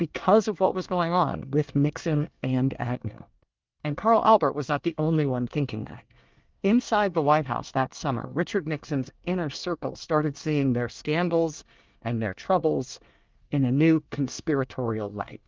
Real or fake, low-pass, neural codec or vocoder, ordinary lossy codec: fake; 7.2 kHz; codec, 24 kHz, 1 kbps, SNAC; Opus, 24 kbps